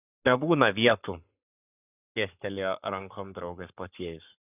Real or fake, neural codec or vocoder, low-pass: fake; codec, 44.1 kHz, 7.8 kbps, Pupu-Codec; 3.6 kHz